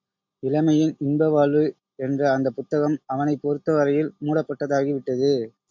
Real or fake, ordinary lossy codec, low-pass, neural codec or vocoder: real; MP3, 48 kbps; 7.2 kHz; none